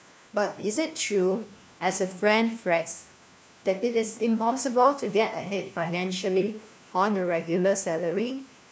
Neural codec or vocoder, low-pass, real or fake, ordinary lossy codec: codec, 16 kHz, 1 kbps, FunCodec, trained on LibriTTS, 50 frames a second; none; fake; none